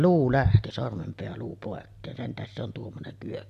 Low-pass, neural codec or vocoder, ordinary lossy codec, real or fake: 14.4 kHz; none; AAC, 96 kbps; real